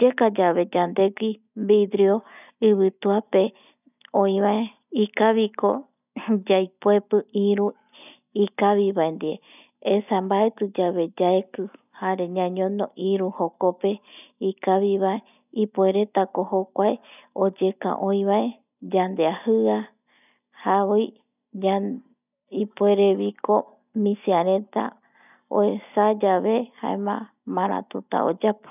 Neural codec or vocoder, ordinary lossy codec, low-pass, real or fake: none; none; 3.6 kHz; real